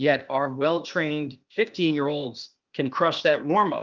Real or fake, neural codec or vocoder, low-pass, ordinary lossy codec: fake; codec, 16 kHz, 0.8 kbps, ZipCodec; 7.2 kHz; Opus, 32 kbps